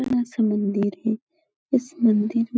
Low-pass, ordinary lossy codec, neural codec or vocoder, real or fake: none; none; none; real